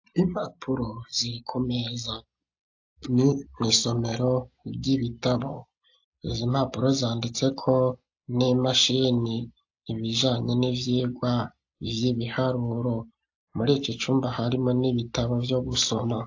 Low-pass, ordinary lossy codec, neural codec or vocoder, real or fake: 7.2 kHz; AAC, 48 kbps; none; real